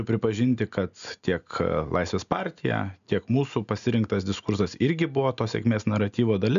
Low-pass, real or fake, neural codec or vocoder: 7.2 kHz; real; none